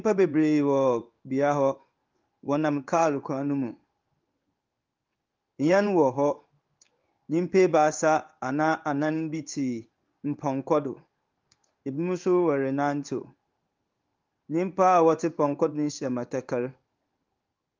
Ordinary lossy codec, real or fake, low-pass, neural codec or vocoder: Opus, 24 kbps; fake; 7.2 kHz; codec, 16 kHz in and 24 kHz out, 1 kbps, XY-Tokenizer